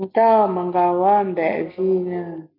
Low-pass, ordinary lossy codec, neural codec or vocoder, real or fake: 5.4 kHz; MP3, 48 kbps; none; real